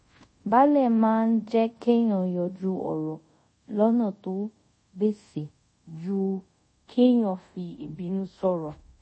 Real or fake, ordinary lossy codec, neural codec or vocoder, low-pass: fake; MP3, 32 kbps; codec, 24 kHz, 0.5 kbps, DualCodec; 9.9 kHz